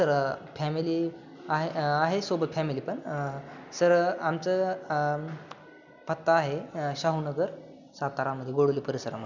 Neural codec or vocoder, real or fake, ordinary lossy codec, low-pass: none; real; none; 7.2 kHz